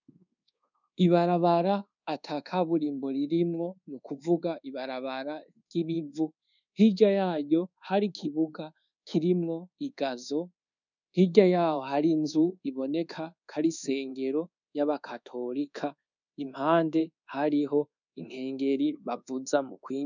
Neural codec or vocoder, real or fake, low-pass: codec, 24 kHz, 1.2 kbps, DualCodec; fake; 7.2 kHz